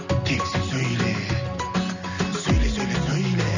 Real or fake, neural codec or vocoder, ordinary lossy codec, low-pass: real; none; none; 7.2 kHz